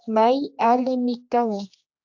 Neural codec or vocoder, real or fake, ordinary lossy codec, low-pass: codec, 16 kHz, 2 kbps, X-Codec, HuBERT features, trained on general audio; fake; AAC, 48 kbps; 7.2 kHz